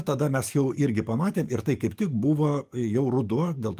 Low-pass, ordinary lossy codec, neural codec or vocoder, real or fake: 14.4 kHz; Opus, 32 kbps; codec, 44.1 kHz, 7.8 kbps, DAC; fake